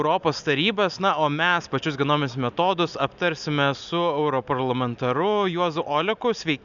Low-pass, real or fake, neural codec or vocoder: 7.2 kHz; real; none